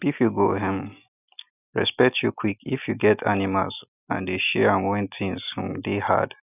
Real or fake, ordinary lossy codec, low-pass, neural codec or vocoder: real; none; 3.6 kHz; none